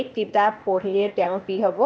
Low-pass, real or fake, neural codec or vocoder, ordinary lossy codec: none; fake; codec, 16 kHz, 0.8 kbps, ZipCodec; none